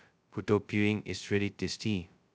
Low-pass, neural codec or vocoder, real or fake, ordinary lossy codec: none; codec, 16 kHz, 0.2 kbps, FocalCodec; fake; none